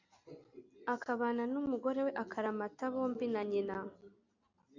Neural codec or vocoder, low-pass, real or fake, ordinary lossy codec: none; 7.2 kHz; real; AAC, 48 kbps